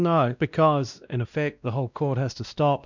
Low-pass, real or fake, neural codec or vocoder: 7.2 kHz; fake; codec, 16 kHz, 1 kbps, X-Codec, WavLM features, trained on Multilingual LibriSpeech